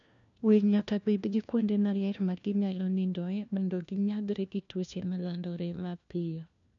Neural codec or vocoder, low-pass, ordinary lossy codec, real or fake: codec, 16 kHz, 1 kbps, FunCodec, trained on LibriTTS, 50 frames a second; 7.2 kHz; none; fake